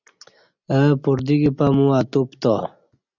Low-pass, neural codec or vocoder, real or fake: 7.2 kHz; none; real